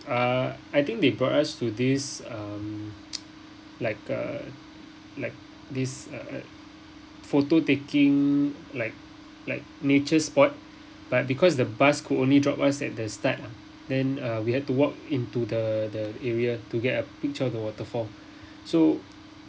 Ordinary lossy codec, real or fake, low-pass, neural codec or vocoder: none; real; none; none